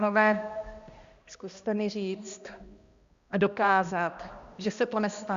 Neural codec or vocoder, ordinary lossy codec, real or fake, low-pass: codec, 16 kHz, 1 kbps, X-Codec, HuBERT features, trained on balanced general audio; Opus, 64 kbps; fake; 7.2 kHz